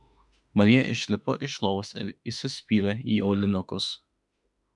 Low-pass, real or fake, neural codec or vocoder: 10.8 kHz; fake; autoencoder, 48 kHz, 32 numbers a frame, DAC-VAE, trained on Japanese speech